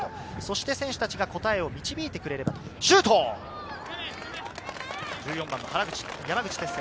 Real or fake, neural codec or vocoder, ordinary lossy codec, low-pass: real; none; none; none